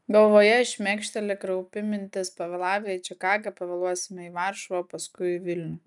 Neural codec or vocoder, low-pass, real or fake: none; 10.8 kHz; real